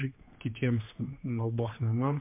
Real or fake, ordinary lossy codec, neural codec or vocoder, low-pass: fake; MP3, 24 kbps; codec, 16 kHz, 2 kbps, X-Codec, HuBERT features, trained on general audio; 3.6 kHz